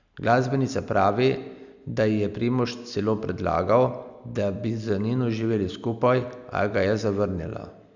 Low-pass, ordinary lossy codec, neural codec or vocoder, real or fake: 7.2 kHz; none; none; real